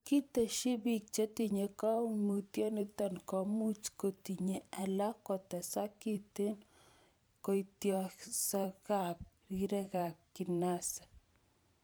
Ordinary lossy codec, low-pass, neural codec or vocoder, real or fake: none; none; vocoder, 44.1 kHz, 128 mel bands every 512 samples, BigVGAN v2; fake